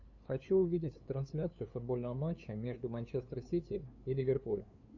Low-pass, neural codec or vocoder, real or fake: 7.2 kHz; codec, 16 kHz, 2 kbps, FunCodec, trained on LibriTTS, 25 frames a second; fake